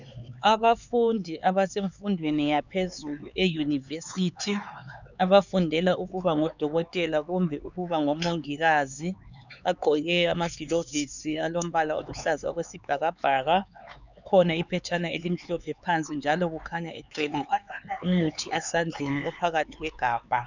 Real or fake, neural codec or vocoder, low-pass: fake; codec, 16 kHz, 4 kbps, X-Codec, HuBERT features, trained on LibriSpeech; 7.2 kHz